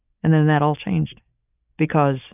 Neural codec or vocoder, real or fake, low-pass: none; real; 3.6 kHz